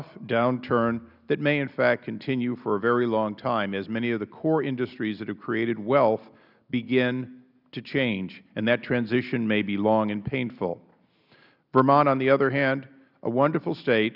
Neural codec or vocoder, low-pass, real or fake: none; 5.4 kHz; real